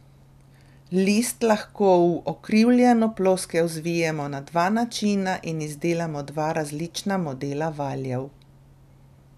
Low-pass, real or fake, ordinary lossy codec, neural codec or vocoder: 14.4 kHz; real; none; none